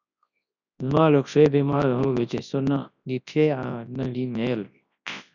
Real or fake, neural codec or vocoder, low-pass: fake; codec, 24 kHz, 0.9 kbps, WavTokenizer, large speech release; 7.2 kHz